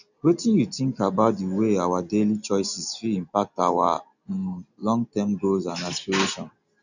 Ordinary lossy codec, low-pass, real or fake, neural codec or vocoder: none; 7.2 kHz; real; none